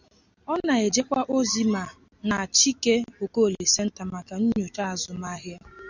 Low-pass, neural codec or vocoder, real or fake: 7.2 kHz; none; real